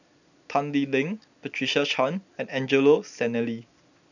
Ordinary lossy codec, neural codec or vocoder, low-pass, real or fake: none; none; 7.2 kHz; real